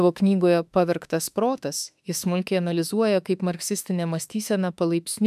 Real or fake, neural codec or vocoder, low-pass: fake; autoencoder, 48 kHz, 32 numbers a frame, DAC-VAE, trained on Japanese speech; 14.4 kHz